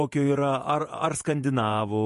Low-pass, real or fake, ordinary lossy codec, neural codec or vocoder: 14.4 kHz; fake; MP3, 48 kbps; vocoder, 48 kHz, 128 mel bands, Vocos